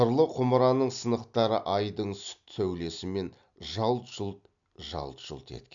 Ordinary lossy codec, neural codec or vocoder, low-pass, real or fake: none; none; 7.2 kHz; real